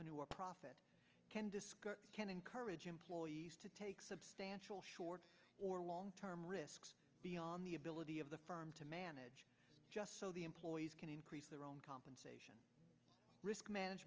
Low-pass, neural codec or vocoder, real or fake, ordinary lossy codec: 7.2 kHz; none; real; Opus, 24 kbps